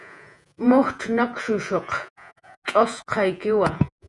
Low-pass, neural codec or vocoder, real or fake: 10.8 kHz; vocoder, 48 kHz, 128 mel bands, Vocos; fake